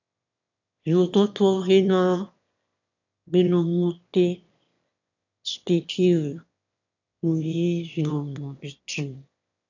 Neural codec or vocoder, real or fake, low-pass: autoencoder, 22.05 kHz, a latent of 192 numbers a frame, VITS, trained on one speaker; fake; 7.2 kHz